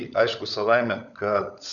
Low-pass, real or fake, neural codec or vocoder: 7.2 kHz; fake; codec, 16 kHz, 16 kbps, FunCodec, trained on LibriTTS, 50 frames a second